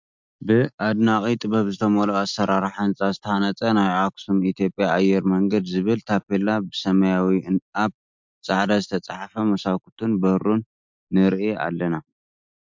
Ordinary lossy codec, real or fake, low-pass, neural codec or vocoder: MP3, 64 kbps; real; 7.2 kHz; none